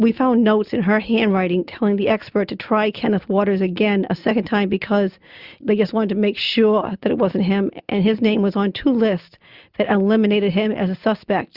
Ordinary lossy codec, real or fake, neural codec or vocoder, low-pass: Opus, 64 kbps; real; none; 5.4 kHz